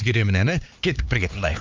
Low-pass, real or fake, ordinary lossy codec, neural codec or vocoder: 7.2 kHz; fake; Opus, 24 kbps; codec, 16 kHz, 4 kbps, X-Codec, HuBERT features, trained on LibriSpeech